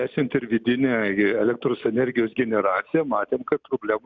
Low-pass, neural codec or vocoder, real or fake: 7.2 kHz; none; real